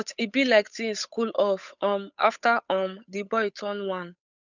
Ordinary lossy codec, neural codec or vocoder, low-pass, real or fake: none; codec, 16 kHz, 8 kbps, FunCodec, trained on Chinese and English, 25 frames a second; 7.2 kHz; fake